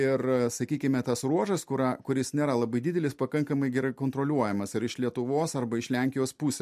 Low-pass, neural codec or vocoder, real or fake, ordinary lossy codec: 14.4 kHz; none; real; MP3, 64 kbps